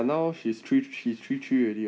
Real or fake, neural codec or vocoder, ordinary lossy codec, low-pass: real; none; none; none